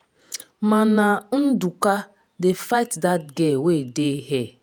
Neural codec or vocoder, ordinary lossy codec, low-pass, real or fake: vocoder, 48 kHz, 128 mel bands, Vocos; none; none; fake